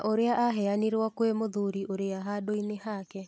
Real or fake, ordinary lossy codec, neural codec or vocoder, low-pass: real; none; none; none